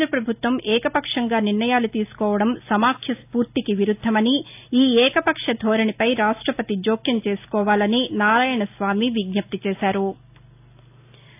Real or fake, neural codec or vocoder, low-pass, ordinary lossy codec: real; none; 3.6 kHz; AAC, 32 kbps